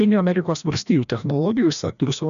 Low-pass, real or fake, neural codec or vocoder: 7.2 kHz; fake; codec, 16 kHz, 1 kbps, FreqCodec, larger model